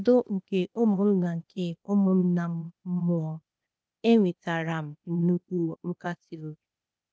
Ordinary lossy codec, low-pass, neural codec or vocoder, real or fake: none; none; codec, 16 kHz, 0.8 kbps, ZipCodec; fake